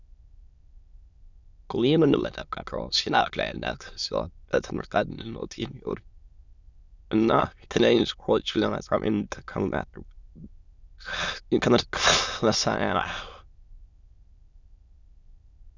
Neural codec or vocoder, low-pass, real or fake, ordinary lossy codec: autoencoder, 22.05 kHz, a latent of 192 numbers a frame, VITS, trained on many speakers; 7.2 kHz; fake; Opus, 64 kbps